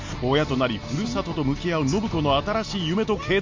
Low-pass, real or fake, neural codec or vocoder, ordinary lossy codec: 7.2 kHz; real; none; MP3, 48 kbps